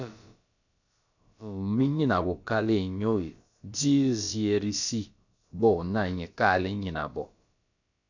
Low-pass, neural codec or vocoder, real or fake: 7.2 kHz; codec, 16 kHz, about 1 kbps, DyCAST, with the encoder's durations; fake